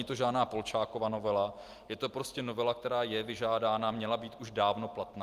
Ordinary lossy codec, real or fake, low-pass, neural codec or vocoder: Opus, 32 kbps; real; 14.4 kHz; none